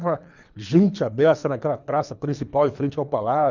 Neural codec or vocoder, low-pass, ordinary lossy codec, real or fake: codec, 24 kHz, 3 kbps, HILCodec; 7.2 kHz; none; fake